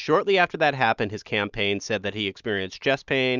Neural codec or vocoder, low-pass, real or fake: none; 7.2 kHz; real